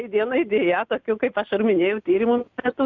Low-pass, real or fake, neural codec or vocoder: 7.2 kHz; real; none